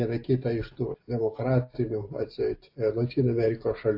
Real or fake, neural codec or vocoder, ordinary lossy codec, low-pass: real; none; AAC, 32 kbps; 5.4 kHz